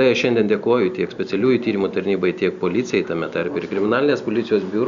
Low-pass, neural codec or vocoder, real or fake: 7.2 kHz; none; real